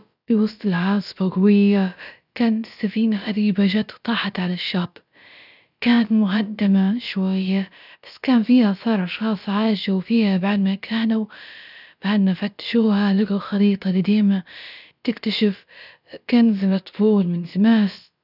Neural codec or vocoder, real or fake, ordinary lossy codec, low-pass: codec, 16 kHz, about 1 kbps, DyCAST, with the encoder's durations; fake; none; 5.4 kHz